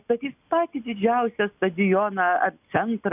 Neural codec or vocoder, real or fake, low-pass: none; real; 3.6 kHz